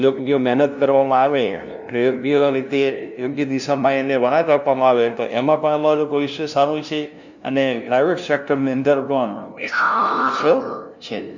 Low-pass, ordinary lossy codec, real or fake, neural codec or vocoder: 7.2 kHz; none; fake; codec, 16 kHz, 0.5 kbps, FunCodec, trained on LibriTTS, 25 frames a second